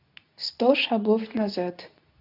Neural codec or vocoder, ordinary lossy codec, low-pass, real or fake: codec, 24 kHz, 0.9 kbps, WavTokenizer, medium speech release version 2; none; 5.4 kHz; fake